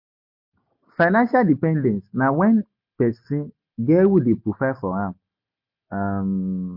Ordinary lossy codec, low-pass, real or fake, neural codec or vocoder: MP3, 32 kbps; 5.4 kHz; real; none